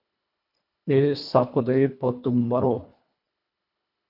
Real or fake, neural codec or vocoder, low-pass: fake; codec, 24 kHz, 1.5 kbps, HILCodec; 5.4 kHz